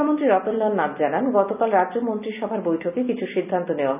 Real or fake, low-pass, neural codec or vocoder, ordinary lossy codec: real; 3.6 kHz; none; none